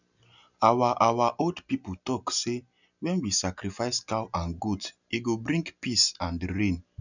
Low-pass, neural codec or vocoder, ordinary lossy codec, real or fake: 7.2 kHz; none; none; real